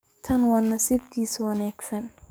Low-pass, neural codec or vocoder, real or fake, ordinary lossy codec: none; codec, 44.1 kHz, 7.8 kbps, DAC; fake; none